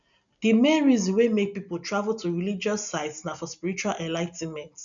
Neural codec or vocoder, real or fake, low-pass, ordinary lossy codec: none; real; 7.2 kHz; none